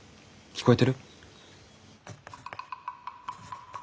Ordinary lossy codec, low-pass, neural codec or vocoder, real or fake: none; none; none; real